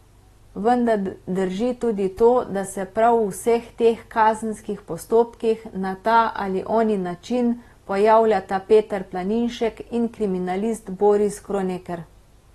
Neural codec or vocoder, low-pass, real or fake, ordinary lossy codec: none; 19.8 kHz; real; AAC, 32 kbps